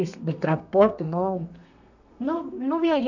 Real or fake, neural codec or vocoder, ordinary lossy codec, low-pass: fake; codec, 32 kHz, 1.9 kbps, SNAC; none; 7.2 kHz